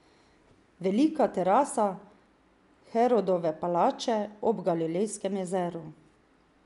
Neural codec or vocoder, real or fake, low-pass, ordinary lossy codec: none; real; 10.8 kHz; none